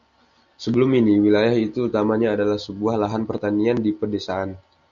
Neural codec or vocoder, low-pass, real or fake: none; 7.2 kHz; real